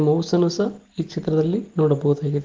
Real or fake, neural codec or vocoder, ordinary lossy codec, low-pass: real; none; Opus, 16 kbps; 7.2 kHz